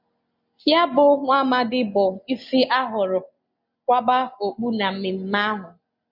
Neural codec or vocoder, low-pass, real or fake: none; 5.4 kHz; real